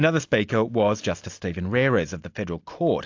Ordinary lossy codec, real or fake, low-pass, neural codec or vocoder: AAC, 48 kbps; real; 7.2 kHz; none